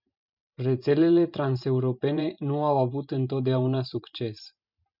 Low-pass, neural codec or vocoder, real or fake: 5.4 kHz; vocoder, 44.1 kHz, 128 mel bands every 512 samples, BigVGAN v2; fake